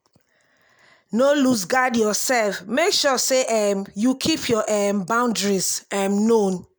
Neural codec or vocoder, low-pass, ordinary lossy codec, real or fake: none; none; none; real